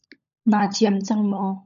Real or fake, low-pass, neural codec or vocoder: fake; 7.2 kHz; codec, 16 kHz, 16 kbps, FunCodec, trained on LibriTTS, 50 frames a second